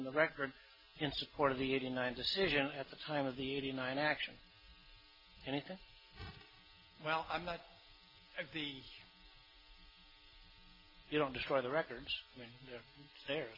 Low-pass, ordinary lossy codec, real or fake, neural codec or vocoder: 5.4 kHz; MP3, 24 kbps; real; none